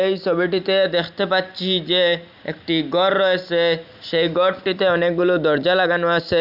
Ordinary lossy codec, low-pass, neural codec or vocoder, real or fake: none; 5.4 kHz; none; real